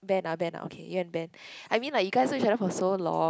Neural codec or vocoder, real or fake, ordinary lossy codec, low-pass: none; real; none; none